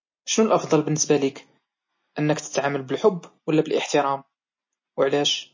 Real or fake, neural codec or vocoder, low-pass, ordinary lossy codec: real; none; 7.2 kHz; MP3, 32 kbps